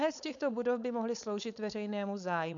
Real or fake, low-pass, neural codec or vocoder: fake; 7.2 kHz; codec, 16 kHz, 4.8 kbps, FACodec